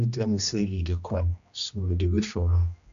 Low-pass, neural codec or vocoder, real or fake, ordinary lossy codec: 7.2 kHz; codec, 16 kHz, 1 kbps, X-Codec, HuBERT features, trained on general audio; fake; AAC, 96 kbps